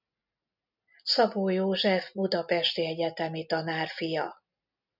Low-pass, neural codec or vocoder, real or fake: 5.4 kHz; none; real